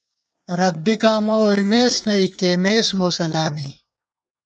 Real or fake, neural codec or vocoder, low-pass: fake; codec, 24 kHz, 1 kbps, SNAC; 9.9 kHz